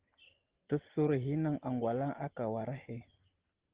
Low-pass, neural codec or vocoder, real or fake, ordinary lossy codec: 3.6 kHz; none; real; Opus, 16 kbps